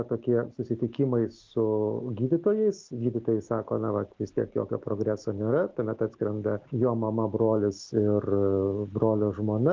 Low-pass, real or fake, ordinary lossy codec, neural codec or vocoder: 7.2 kHz; real; Opus, 16 kbps; none